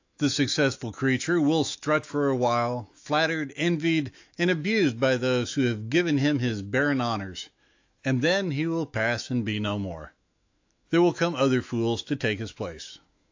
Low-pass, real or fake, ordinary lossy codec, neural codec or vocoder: 7.2 kHz; fake; AAC, 48 kbps; autoencoder, 48 kHz, 128 numbers a frame, DAC-VAE, trained on Japanese speech